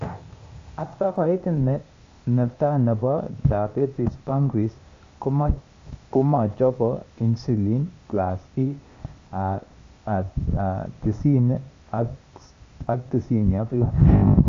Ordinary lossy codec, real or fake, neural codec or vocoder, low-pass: AAC, 48 kbps; fake; codec, 16 kHz, 0.8 kbps, ZipCodec; 7.2 kHz